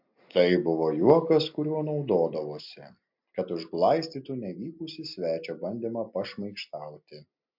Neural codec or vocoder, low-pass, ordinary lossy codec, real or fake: none; 5.4 kHz; MP3, 48 kbps; real